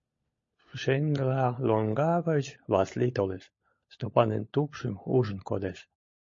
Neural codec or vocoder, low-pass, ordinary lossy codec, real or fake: codec, 16 kHz, 16 kbps, FunCodec, trained on LibriTTS, 50 frames a second; 7.2 kHz; MP3, 32 kbps; fake